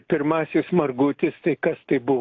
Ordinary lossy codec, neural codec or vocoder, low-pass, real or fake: AAC, 48 kbps; none; 7.2 kHz; real